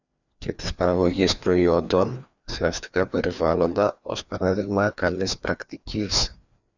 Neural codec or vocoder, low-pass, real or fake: codec, 16 kHz, 2 kbps, FreqCodec, larger model; 7.2 kHz; fake